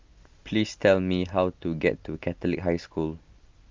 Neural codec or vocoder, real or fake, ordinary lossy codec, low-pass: none; real; Opus, 32 kbps; 7.2 kHz